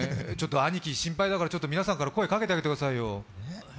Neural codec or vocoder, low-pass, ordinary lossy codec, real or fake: none; none; none; real